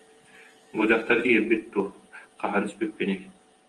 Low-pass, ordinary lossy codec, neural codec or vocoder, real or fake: 10.8 kHz; Opus, 24 kbps; vocoder, 48 kHz, 128 mel bands, Vocos; fake